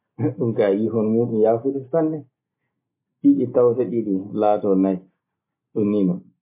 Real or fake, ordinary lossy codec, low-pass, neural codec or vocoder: real; MP3, 24 kbps; 3.6 kHz; none